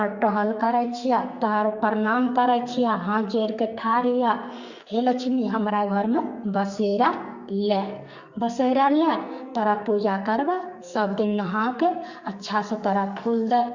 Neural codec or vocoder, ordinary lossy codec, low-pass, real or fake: codec, 44.1 kHz, 2.6 kbps, SNAC; Opus, 64 kbps; 7.2 kHz; fake